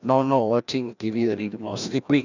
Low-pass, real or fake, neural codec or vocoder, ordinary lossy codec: 7.2 kHz; fake; codec, 16 kHz, 1 kbps, FreqCodec, larger model; none